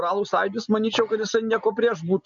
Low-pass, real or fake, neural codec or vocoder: 7.2 kHz; real; none